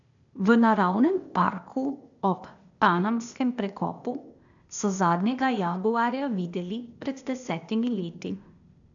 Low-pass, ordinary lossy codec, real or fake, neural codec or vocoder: 7.2 kHz; none; fake; codec, 16 kHz, 0.8 kbps, ZipCodec